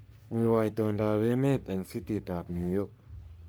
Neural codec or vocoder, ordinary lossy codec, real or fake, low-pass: codec, 44.1 kHz, 3.4 kbps, Pupu-Codec; none; fake; none